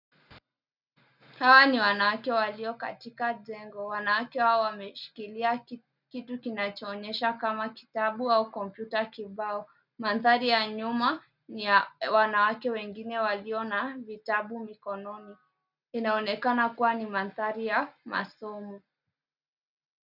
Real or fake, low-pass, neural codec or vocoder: real; 5.4 kHz; none